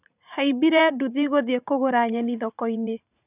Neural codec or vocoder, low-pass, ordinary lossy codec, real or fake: vocoder, 44.1 kHz, 80 mel bands, Vocos; 3.6 kHz; none; fake